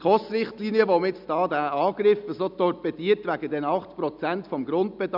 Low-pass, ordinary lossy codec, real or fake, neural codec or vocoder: 5.4 kHz; none; real; none